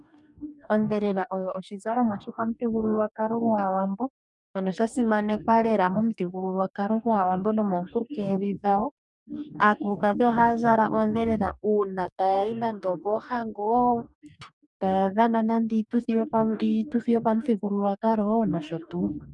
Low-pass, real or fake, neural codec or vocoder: 10.8 kHz; fake; codec, 44.1 kHz, 2.6 kbps, DAC